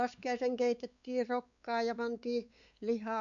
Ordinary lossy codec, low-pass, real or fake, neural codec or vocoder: none; 7.2 kHz; fake; codec, 16 kHz, 4 kbps, X-Codec, WavLM features, trained on Multilingual LibriSpeech